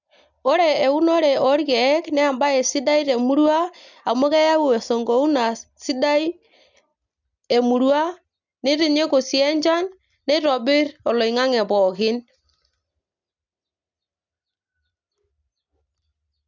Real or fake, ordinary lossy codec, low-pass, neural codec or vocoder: real; none; 7.2 kHz; none